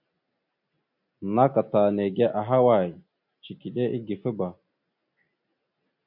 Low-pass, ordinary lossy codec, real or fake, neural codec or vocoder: 5.4 kHz; MP3, 48 kbps; real; none